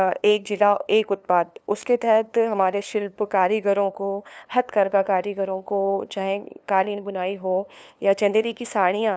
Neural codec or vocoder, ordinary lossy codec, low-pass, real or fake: codec, 16 kHz, 2 kbps, FunCodec, trained on LibriTTS, 25 frames a second; none; none; fake